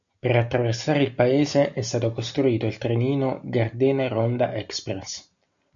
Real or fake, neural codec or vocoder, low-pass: real; none; 7.2 kHz